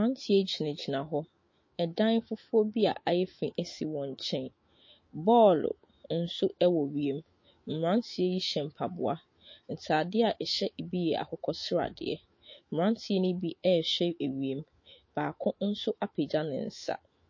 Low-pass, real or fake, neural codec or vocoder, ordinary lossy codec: 7.2 kHz; fake; autoencoder, 48 kHz, 128 numbers a frame, DAC-VAE, trained on Japanese speech; MP3, 32 kbps